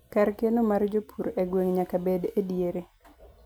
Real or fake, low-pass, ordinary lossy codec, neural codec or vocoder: real; none; none; none